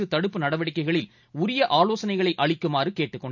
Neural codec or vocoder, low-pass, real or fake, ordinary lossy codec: none; 7.2 kHz; real; none